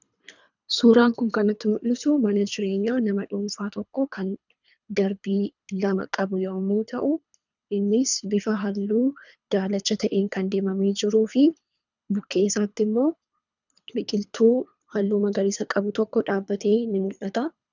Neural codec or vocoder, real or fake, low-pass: codec, 24 kHz, 3 kbps, HILCodec; fake; 7.2 kHz